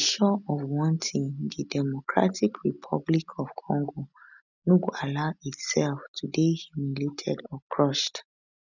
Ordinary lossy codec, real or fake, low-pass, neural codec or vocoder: none; real; 7.2 kHz; none